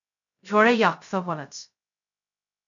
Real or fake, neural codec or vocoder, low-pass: fake; codec, 16 kHz, 0.2 kbps, FocalCodec; 7.2 kHz